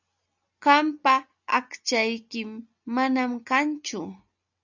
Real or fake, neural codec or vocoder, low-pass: real; none; 7.2 kHz